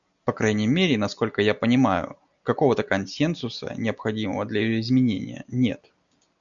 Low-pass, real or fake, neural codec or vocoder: 7.2 kHz; real; none